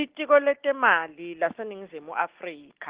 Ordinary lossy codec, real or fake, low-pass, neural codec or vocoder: Opus, 16 kbps; real; 3.6 kHz; none